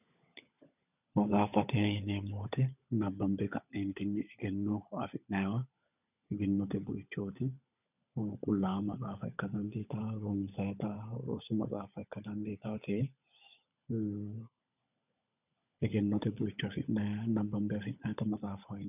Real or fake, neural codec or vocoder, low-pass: fake; codec, 16 kHz, 16 kbps, FunCodec, trained on LibriTTS, 50 frames a second; 3.6 kHz